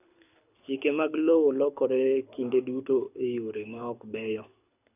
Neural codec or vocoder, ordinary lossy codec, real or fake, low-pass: codec, 24 kHz, 6 kbps, HILCodec; none; fake; 3.6 kHz